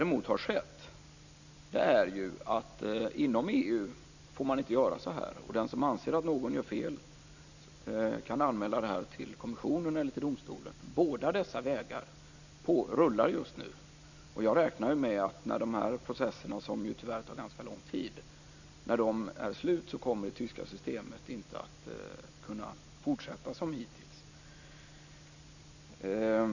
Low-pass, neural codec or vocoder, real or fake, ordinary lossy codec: 7.2 kHz; none; real; none